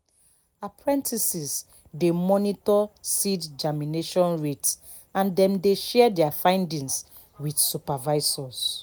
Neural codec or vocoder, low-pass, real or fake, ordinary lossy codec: none; none; real; none